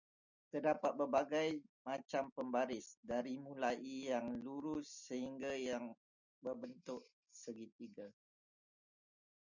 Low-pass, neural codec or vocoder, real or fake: 7.2 kHz; none; real